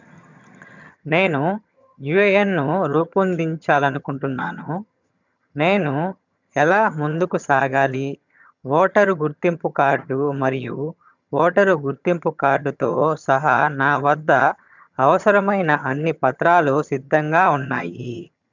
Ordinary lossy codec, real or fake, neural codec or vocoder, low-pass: none; fake; vocoder, 22.05 kHz, 80 mel bands, HiFi-GAN; 7.2 kHz